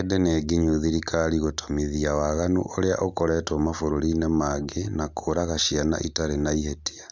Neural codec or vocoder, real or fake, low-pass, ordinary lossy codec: none; real; none; none